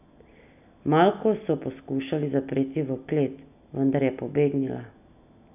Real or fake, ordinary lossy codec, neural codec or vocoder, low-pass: real; none; none; 3.6 kHz